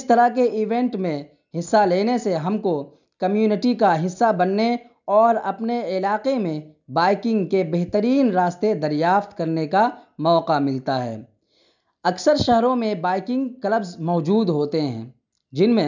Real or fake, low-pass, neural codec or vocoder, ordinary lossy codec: real; 7.2 kHz; none; none